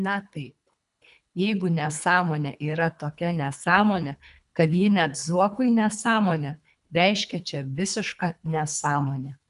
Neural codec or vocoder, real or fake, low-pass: codec, 24 kHz, 3 kbps, HILCodec; fake; 10.8 kHz